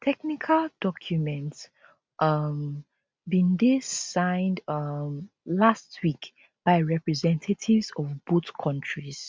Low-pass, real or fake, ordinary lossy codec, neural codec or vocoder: none; real; none; none